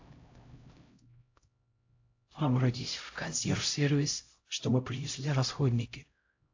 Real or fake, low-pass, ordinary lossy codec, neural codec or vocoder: fake; 7.2 kHz; AAC, 48 kbps; codec, 16 kHz, 0.5 kbps, X-Codec, HuBERT features, trained on LibriSpeech